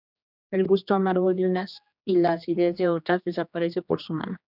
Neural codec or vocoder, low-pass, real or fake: codec, 16 kHz, 2 kbps, X-Codec, HuBERT features, trained on general audio; 5.4 kHz; fake